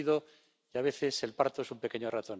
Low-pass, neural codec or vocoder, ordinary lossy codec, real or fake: none; none; none; real